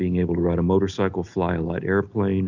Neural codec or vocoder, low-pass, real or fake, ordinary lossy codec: none; 7.2 kHz; real; Opus, 64 kbps